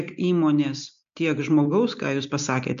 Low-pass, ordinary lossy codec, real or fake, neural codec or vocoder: 7.2 kHz; MP3, 64 kbps; real; none